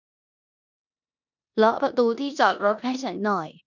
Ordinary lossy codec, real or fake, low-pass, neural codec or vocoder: none; fake; 7.2 kHz; codec, 16 kHz in and 24 kHz out, 0.9 kbps, LongCat-Audio-Codec, four codebook decoder